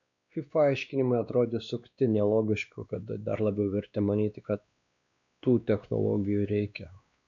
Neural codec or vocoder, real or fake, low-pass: codec, 16 kHz, 2 kbps, X-Codec, WavLM features, trained on Multilingual LibriSpeech; fake; 7.2 kHz